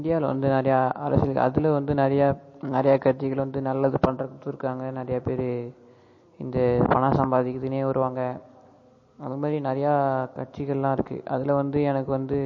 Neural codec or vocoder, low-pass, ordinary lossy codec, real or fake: none; 7.2 kHz; MP3, 32 kbps; real